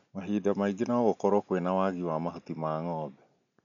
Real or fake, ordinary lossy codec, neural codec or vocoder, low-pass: real; none; none; 7.2 kHz